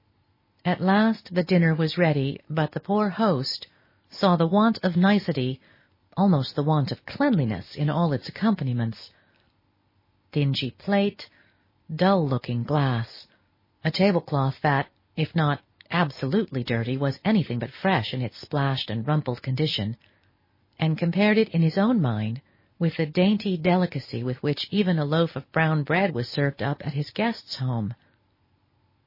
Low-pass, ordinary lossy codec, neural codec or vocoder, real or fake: 5.4 kHz; MP3, 24 kbps; none; real